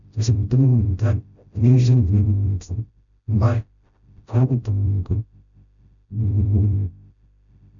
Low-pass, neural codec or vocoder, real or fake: 7.2 kHz; codec, 16 kHz, 0.5 kbps, FreqCodec, smaller model; fake